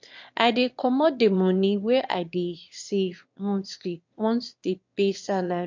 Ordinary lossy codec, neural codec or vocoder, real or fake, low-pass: MP3, 48 kbps; autoencoder, 22.05 kHz, a latent of 192 numbers a frame, VITS, trained on one speaker; fake; 7.2 kHz